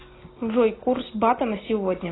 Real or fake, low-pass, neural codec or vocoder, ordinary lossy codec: real; 7.2 kHz; none; AAC, 16 kbps